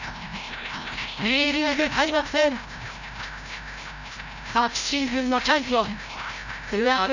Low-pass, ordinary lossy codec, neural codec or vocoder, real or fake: 7.2 kHz; none; codec, 16 kHz, 0.5 kbps, FreqCodec, larger model; fake